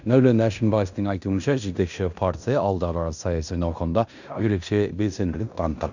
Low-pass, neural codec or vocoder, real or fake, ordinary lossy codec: 7.2 kHz; codec, 16 kHz in and 24 kHz out, 0.9 kbps, LongCat-Audio-Codec, fine tuned four codebook decoder; fake; none